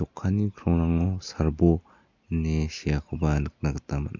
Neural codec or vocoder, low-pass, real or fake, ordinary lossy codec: none; 7.2 kHz; real; MP3, 48 kbps